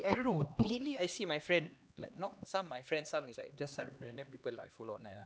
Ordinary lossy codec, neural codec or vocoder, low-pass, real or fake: none; codec, 16 kHz, 2 kbps, X-Codec, HuBERT features, trained on LibriSpeech; none; fake